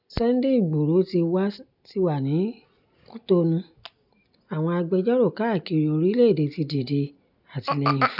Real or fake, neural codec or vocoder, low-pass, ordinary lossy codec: real; none; 5.4 kHz; none